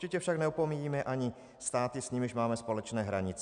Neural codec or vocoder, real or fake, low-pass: none; real; 9.9 kHz